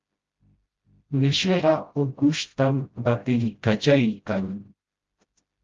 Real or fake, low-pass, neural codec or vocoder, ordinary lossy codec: fake; 7.2 kHz; codec, 16 kHz, 0.5 kbps, FreqCodec, smaller model; Opus, 32 kbps